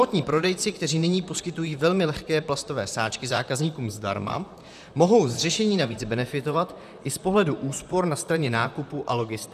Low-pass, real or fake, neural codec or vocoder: 14.4 kHz; fake; vocoder, 44.1 kHz, 128 mel bands, Pupu-Vocoder